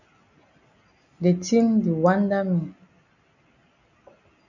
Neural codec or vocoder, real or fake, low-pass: none; real; 7.2 kHz